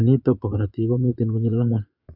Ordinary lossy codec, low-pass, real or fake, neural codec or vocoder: none; 5.4 kHz; fake; vocoder, 22.05 kHz, 80 mel bands, Vocos